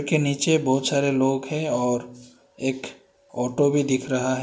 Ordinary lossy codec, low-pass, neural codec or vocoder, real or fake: none; none; none; real